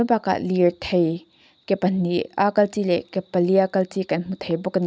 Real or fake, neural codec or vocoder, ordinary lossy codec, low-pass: real; none; none; none